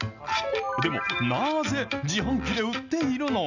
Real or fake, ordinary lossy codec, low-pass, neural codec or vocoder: real; none; 7.2 kHz; none